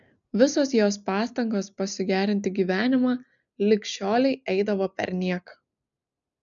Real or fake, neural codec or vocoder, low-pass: real; none; 7.2 kHz